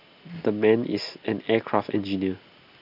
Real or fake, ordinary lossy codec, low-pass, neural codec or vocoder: real; AAC, 48 kbps; 5.4 kHz; none